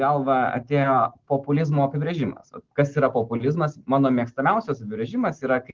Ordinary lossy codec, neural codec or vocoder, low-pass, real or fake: Opus, 16 kbps; none; 7.2 kHz; real